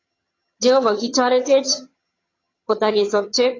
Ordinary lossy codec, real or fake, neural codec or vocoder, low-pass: AAC, 32 kbps; fake; vocoder, 22.05 kHz, 80 mel bands, HiFi-GAN; 7.2 kHz